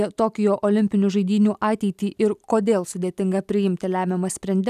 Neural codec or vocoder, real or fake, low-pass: none; real; 14.4 kHz